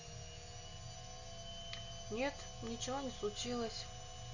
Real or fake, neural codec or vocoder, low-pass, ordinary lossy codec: real; none; 7.2 kHz; none